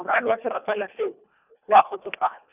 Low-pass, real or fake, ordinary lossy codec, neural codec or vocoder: 3.6 kHz; fake; none; codec, 24 kHz, 1.5 kbps, HILCodec